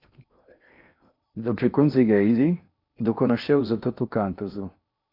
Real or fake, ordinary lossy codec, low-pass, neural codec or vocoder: fake; MP3, 48 kbps; 5.4 kHz; codec, 16 kHz in and 24 kHz out, 0.8 kbps, FocalCodec, streaming, 65536 codes